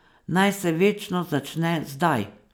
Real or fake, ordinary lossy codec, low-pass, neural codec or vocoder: real; none; none; none